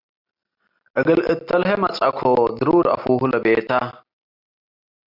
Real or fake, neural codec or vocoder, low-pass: real; none; 5.4 kHz